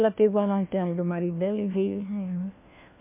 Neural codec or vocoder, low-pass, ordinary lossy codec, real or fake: codec, 16 kHz, 1 kbps, FunCodec, trained on LibriTTS, 50 frames a second; 3.6 kHz; MP3, 24 kbps; fake